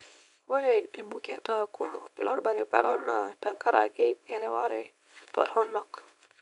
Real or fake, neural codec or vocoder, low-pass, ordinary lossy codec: fake; codec, 24 kHz, 0.9 kbps, WavTokenizer, small release; 10.8 kHz; none